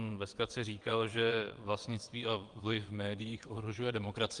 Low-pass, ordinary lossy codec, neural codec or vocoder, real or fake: 9.9 kHz; Opus, 24 kbps; vocoder, 22.05 kHz, 80 mel bands, WaveNeXt; fake